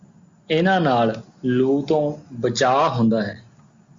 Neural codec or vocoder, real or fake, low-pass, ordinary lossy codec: none; real; 7.2 kHz; Opus, 64 kbps